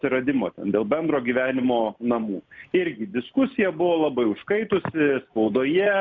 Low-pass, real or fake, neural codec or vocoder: 7.2 kHz; real; none